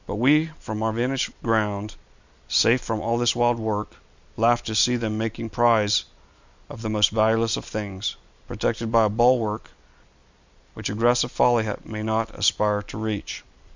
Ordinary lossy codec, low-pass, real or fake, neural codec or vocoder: Opus, 64 kbps; 7.2 kHz; real; none